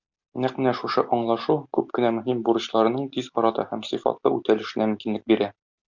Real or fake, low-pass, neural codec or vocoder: real; 7.2 kHz; none